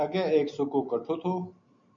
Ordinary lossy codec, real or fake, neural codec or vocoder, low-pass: MP3, 64 kbps; real; none; 7.2 kHz